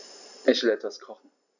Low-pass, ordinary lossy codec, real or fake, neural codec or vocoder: 7.2 kHz; none; real; none